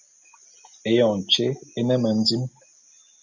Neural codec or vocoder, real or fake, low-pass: none; real; 7.2 kHz